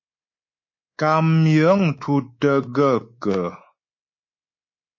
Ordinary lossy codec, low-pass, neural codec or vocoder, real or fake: MP3, 32 kbps; 7.2 kHz; codec, 24 kHz, 3.1 kbps, DualCodec; fake